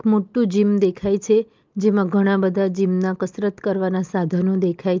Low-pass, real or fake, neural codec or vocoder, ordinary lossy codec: 7.2 kHz; real; none; Opus, 32 kbps